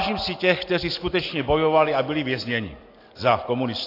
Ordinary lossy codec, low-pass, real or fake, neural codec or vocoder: AAC, 32 kbps; 5.4 kHz; real; none